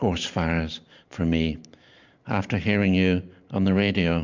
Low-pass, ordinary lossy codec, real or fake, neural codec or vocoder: 7.2 kHz; MP3, 64 kbps; fake; vocoder, 44.1 kHz, 128 mel bands every 512 samples, BigVGAN v2